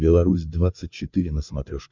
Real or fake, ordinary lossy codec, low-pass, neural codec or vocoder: fake; Opus, 64 kbps; 7.2 kHz; codec, 16 kHz, 8 kbps, FreqCodec, larger model